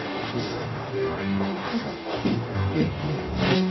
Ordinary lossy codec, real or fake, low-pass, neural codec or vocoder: MP3, 24 kbps; fake; 7.2 kHz; codec, 44.1 kHz, 0.9 kbps, DAC